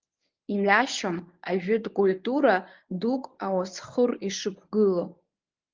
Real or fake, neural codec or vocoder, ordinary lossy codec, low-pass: fake; codec, 24 kHz, 0.9 kbps, WavTokenizer, medium speech release version 1; Opus, 32 kbps; 7.2 kHz